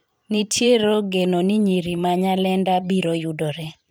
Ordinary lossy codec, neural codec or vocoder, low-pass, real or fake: none; vocoder, 44.1 kHz, 128 mel bands every 512 samples, BigVGAN v2; none; fake